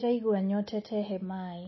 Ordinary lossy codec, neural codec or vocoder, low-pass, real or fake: MP3, 24 kbps; none; 7.2 kHz; real